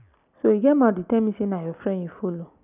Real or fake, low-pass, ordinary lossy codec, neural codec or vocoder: real; 3.6 kHz; none; none